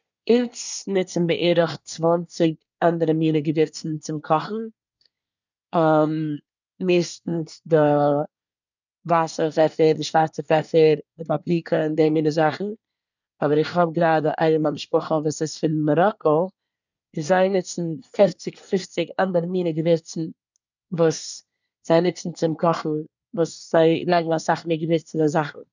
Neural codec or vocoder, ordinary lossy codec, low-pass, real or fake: codec, 24 kHz, 1 kbps, SNAC; none; 7.2 kHz; fake